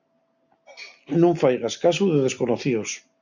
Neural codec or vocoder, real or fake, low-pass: none; real; 7.2 kHz